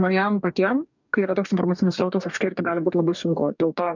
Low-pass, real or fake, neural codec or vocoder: 7.2 kHz; fake; codec, 44.1 kHz, 2.6 kbps, DAC